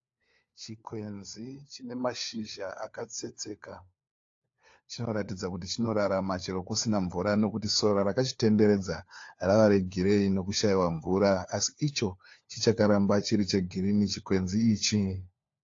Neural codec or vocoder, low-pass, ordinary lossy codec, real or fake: codec, 16 kHz, 4 kbps, FunCodec, trained on LibriTTS, 50 frames a second; 7.2 kHz; AAC, 48 kbps; fake